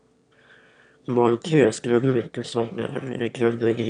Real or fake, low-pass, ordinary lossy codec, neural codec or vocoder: fake; 9.9 kHz; none; autoencoder, 22.05 kHz, a latent of 192 numbers a frame, VITS, trained on one speaker